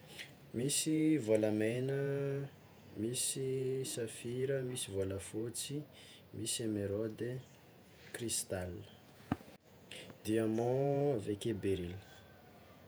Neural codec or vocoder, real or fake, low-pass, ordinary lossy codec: vocoder, 48 kHz, 128 mel bands, Vocos; fake; none; none